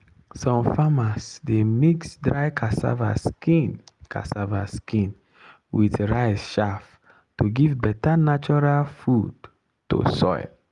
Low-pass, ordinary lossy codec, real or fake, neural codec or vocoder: 10.8 kHz; Opus, 32 kbps; real; none